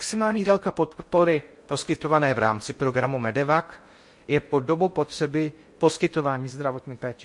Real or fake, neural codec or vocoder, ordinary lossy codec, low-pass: fake; codec, 16 kHz in and 24 kHz out, 0.6 kbps, FocalCodec, streaming, 4096 codes; MP3, 48 kbps; 10.8 kHz